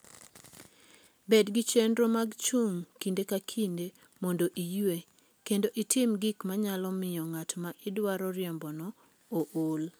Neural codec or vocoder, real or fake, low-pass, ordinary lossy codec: none; real; none; none